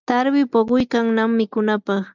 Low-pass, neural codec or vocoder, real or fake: 7.2 kHz; none; real